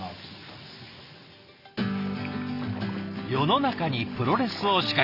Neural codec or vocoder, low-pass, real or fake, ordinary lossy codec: none; 5.4 kHz; real; Opus, 64 kbps